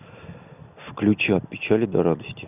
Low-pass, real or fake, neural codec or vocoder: 3.6 kHz; real; none